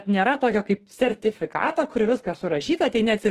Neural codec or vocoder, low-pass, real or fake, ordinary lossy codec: autoencoder, 48 kHz, 32 numbers a frame, DAC-VAE, trained on Japanese speech; 14.4 kHz; fake; Opus, 16 kbps